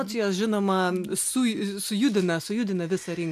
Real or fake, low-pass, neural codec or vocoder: real; 14.4 kHz; none